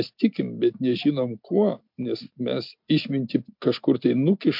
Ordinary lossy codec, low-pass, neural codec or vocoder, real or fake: MP3, 48 kbps; 5.4 kHz; none; real